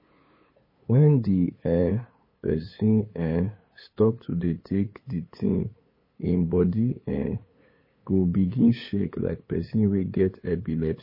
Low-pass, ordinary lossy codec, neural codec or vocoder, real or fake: 5.4 kHz; MP3, 24 kbps; codec, 16 kHz, 8 kbps, FunCodec, trained on LibriTTS, 25 frames a second; fake